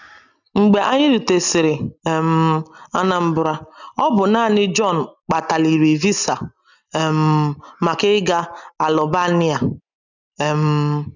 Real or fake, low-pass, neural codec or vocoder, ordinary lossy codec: real; 7.2 kHz; none; none